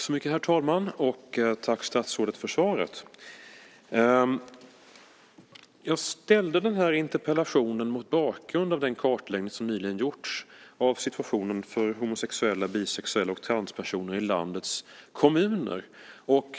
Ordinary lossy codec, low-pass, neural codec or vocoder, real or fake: none; none; none; real